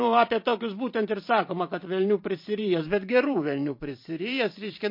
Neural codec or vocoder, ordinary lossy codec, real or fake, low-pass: vocoder, 44.1 kHz, 128 mel bands every 512 samples, BigVGAN v2; MP3, 32 kbps; fake; 5.4 kHz